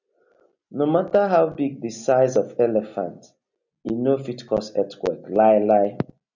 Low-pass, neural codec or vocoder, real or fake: 7.2 kHz; none; real